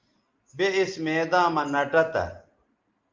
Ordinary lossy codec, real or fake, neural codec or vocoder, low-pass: Opus, 32 kbps; real; none; 7.2 kHz